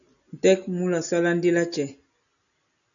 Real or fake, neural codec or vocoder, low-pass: real; none; 7.2 kHz